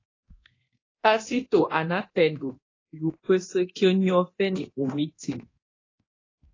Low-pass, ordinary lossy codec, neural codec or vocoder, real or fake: 7.2 kHz; AAC, 32 kbps; codec, 24 kHz, 0.9 kbps, DualCodec; fake